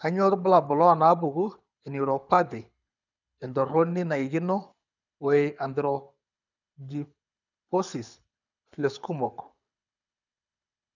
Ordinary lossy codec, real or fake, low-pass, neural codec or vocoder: none; fake; 7.2 kHz; codec, 24 kHz, 6 kbps, HILCodec